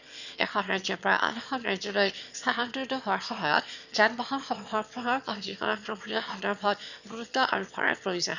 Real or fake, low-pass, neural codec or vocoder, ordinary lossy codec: fake; 7.2 kHz; autoencoder, 22.05 kHz, a latent of 192 numbers a frame, VITS, trained on one speaker; none